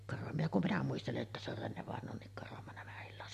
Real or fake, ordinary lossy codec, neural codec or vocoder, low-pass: real; MP3, 64 kbps; none; 14.4 kHz